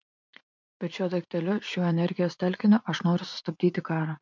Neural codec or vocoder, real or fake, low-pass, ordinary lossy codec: vocoder, 44.1 kHz, 80 mel bands, Vocos; fake; 7.2 kHz; MP3, 64 kbps